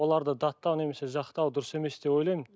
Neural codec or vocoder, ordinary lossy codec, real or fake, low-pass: none; none; real; none